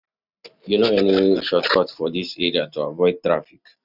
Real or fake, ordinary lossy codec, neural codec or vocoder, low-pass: real; none; none; 5.4 kHz